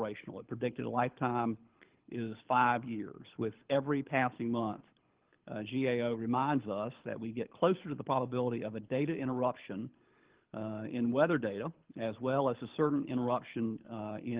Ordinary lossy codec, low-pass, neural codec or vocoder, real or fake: Opus, 16 kbps; 3.6 kHz; codec, 24 kHz, 6 kbps, HILCodec; fake